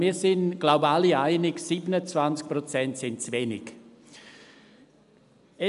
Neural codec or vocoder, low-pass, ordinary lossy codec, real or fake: none; 10.8 kHz; none; real